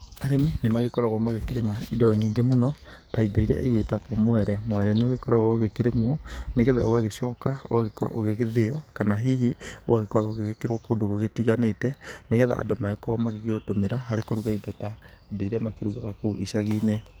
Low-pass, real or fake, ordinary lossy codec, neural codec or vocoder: none; fake; none; codec, 44.1 kHz, 2.6 kbps, SNAC